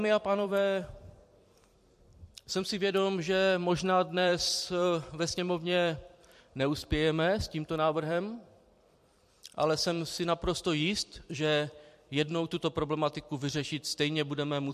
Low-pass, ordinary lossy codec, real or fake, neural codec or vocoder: 14.4 kHz; MP3, 64 kbps; fake; vocoder, 44.1 kHz, 128 mel bands every 256 samples, BigVGAN v2